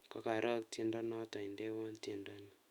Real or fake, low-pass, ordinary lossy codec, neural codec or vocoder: fake; 19.8 kHz; Opus, 64 kbps; autoencoder, 48 kHz, 128 numbers a frame, DAC-VAE, trained on Japanese speech